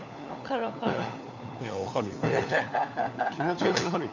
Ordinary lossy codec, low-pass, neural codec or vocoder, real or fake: none; 7.2 kHz; codec, 16 kHz, 4 kbps, FunCodec, trained on LibriTTS, 50 frames a second; fake